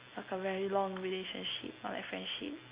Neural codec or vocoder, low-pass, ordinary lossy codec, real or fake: none; 3.6 kHz; Opus, 64 kbps; real